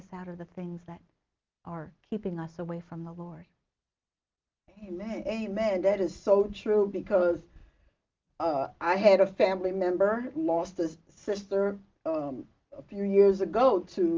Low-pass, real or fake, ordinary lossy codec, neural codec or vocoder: 7.2 kHz; real; Opus, 32 kbps; none